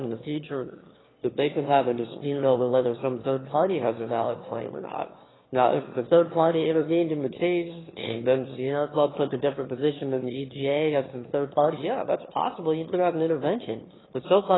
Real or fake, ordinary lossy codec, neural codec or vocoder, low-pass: fake; AAC, 16 kbps; autoencoder, 22.05 kHz, a latent of 192 numbers a frame, VITS, trained on one speaker; 7.2 kHz